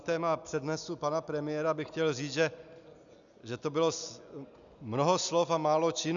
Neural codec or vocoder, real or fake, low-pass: none; real; 7.2 kHz